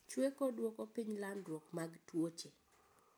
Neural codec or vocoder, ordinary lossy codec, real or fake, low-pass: none; none; real; none